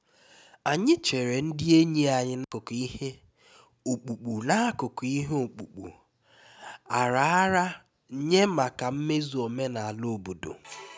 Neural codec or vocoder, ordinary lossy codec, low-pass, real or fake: none; none; none; real